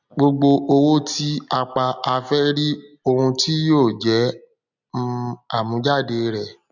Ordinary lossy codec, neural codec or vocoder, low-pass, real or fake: none; none; 7.2 kHz; real